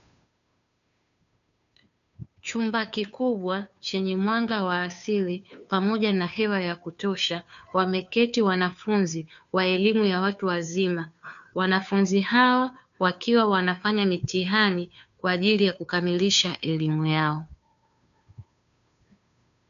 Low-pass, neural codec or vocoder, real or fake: 7.2 kHz; codec, 16 kHz, 2 kbps, FunCodec, trained on Chinese and English, 25 frames a second; fake